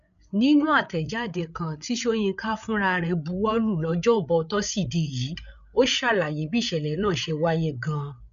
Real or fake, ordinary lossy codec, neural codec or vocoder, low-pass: fake; none; codec, 16 kHz, 8 kbps, FreqCodec, larger model; 7.2 kHz